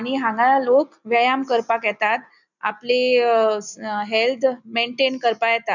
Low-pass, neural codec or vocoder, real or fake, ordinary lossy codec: 7.2 kHz; none; real; none